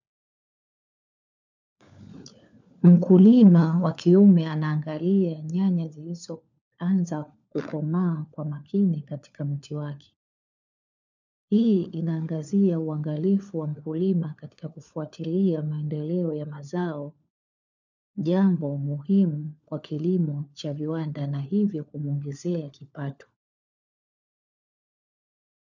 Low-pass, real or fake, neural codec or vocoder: 7.2 kHz; fake; codec, 16 kHz, 4 kbps, FunCodec, trained on LibriTTS, 50 frames a second